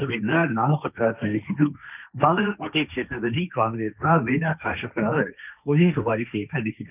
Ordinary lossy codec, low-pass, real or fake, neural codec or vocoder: none; 3.6 kHz; fake; codec, 16 kHz, 1.1 kbps, Voila-Tokenizer